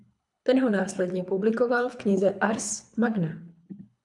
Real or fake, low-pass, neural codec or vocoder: fake; 10.8 kHz; codec, 24 kHz, 3 kbps, HILCodec